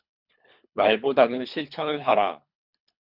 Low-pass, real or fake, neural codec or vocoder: 5.4 kHz; fake; codec, 24 kHz, 3 kbps, HILCodec